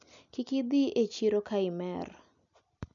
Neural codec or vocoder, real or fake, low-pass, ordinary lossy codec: none; real; 7.2 kHz; none